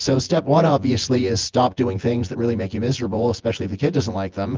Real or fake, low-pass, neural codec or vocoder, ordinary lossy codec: fake; 7.2 kHz; vocoder, 24 kHz, 100 mel bands, Vocos; Opus, 32 kbps